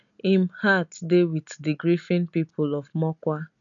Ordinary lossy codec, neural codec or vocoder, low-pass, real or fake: none; none; 7.2 kHz; real